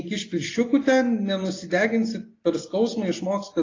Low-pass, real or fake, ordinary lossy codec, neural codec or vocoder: 7.2 kHz; real; AAC, 32 kbps; none